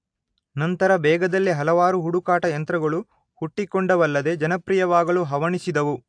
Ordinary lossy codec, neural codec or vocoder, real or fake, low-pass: AAC, 64 kbps; none; real; 9.9 kHz